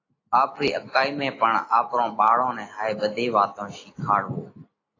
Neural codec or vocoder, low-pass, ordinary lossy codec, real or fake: none; 7.2 kHz; AAC, 32 kbps; real